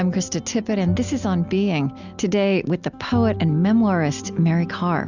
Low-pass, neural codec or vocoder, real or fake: 7.2 kHz; none; real